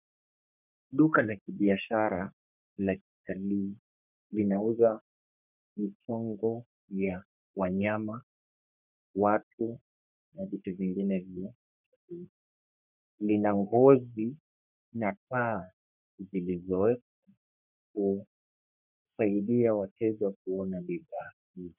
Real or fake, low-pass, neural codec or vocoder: fake; 3.6 kHz; codec, 44.1 kHz, 3.4 kbps, Pupu-Codec